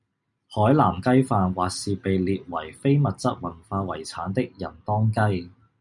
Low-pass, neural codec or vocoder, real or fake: 10.8 kHz; none; real